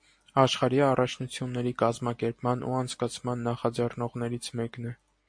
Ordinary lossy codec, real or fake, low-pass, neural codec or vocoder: MP3, 48 kbps; real; 9.9 kHz; none